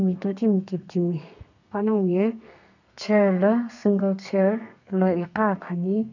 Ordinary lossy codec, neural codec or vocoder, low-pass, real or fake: none; codec, 32 kHz, 1.9 kbps, SNAC; 7.2 kHz; fake